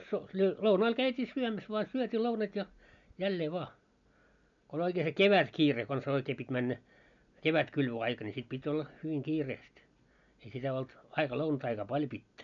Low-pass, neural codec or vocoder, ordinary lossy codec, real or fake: 7.2 kHz; none; none; real